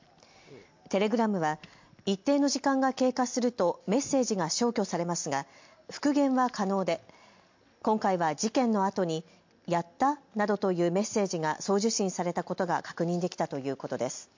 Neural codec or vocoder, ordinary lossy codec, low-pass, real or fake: none; MP3, 48 kbps; 7.2 kHz; real